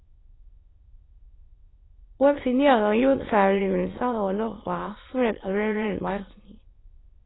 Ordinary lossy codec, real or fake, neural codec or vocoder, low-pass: AAC, 16 kbps; fake; autoencoder, 22.05 kHz, a latent of 192 numbers a frame, VITS, trained on many speakers; 7.2 kHz